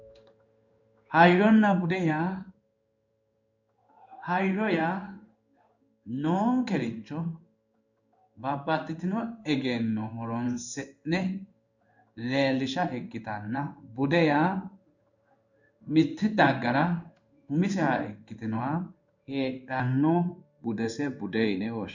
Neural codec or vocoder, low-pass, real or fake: codec, 16 kHz in and 24 kHz out, 1 kbps, XY-Tokenizer; 7.2 kHz; fake